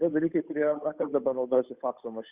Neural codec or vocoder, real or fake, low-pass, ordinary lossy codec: codec, 16 kHz, 8 kbps, FunCodec, trained on Chinese and English, 25 frames a second; fake; 3.6 kHz; Opus, 64 kbps